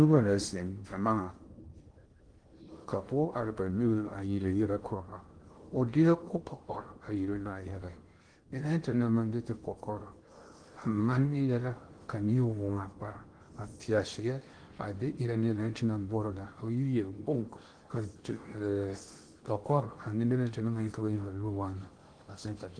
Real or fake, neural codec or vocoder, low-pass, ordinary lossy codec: fake; codec, 16 kHz in and 24 kHz out, 0.6 kbps, FocalCodec, streaming, 4096 codes; 9.9 kHz; Opus, 24 kbps